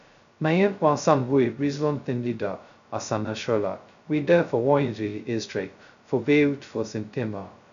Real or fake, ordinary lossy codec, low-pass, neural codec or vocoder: fake; none; 7.2 kHz; codec, 16 kHz, 0.2 kbps, FocalCodec